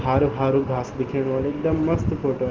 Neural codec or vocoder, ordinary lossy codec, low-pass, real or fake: none; Opus, 16 kbps; 7.2 kHz; real